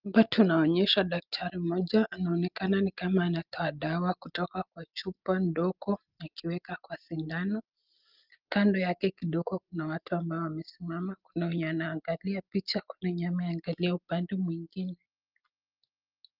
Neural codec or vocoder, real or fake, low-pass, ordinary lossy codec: none; real; 5.4 kHz; Opus, 24 kbps